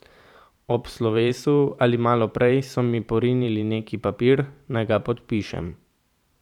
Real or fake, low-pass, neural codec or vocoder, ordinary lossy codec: fake; 19.8 kHz; vocoder, 44.1 kHz, 128 mel bands every 512 samples, BigVGAN v2; none